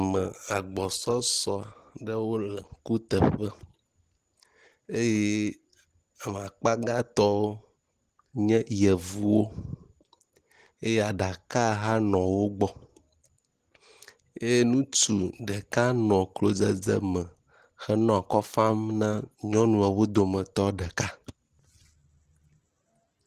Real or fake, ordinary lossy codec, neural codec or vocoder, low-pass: real; Opus, 16 kbps; none; 14.4 kHz